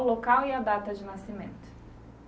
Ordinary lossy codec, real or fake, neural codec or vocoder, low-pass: none; real; none; none